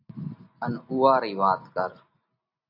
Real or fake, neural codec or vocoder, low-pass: real; none; 5.4 kHz